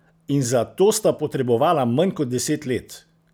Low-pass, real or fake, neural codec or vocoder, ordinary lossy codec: none; real; none; none